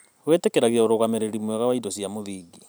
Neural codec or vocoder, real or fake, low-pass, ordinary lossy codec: vocoder, 44.1 kHz, 128 mel bands every 512 samples, BigVGAN v2; fake; none; none